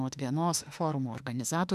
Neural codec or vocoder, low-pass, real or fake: autoencoder, 48 kHz, 32 numbers a frame, DAC-VAE, trained on Japanese speech; 14.4 kHz; fake